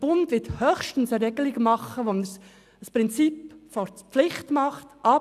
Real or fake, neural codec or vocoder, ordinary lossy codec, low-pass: real; none; none; 14.4 kHz